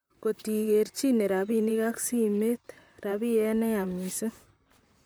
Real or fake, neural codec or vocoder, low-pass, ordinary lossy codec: fake; vocoder, 44.1 kHz, 128 mel bands, Pupu-Vocoder; none; none